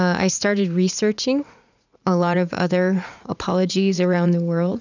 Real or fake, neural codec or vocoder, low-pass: fake; vocoder, 44.1 kHz, 80 mel bands, Vocos; 7.2 kHz